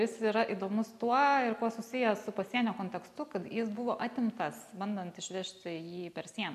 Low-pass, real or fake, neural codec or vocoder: 14.4 kHz; real; none